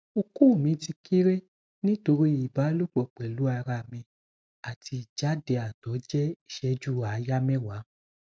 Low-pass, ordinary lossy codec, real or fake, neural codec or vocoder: none; none; real; none